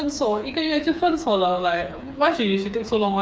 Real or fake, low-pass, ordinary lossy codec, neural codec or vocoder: fake; none; none; codec, 16 kHz, 4 kbps, FreqCodec, smaller model